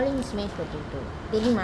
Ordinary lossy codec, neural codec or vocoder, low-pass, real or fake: none; none; none; real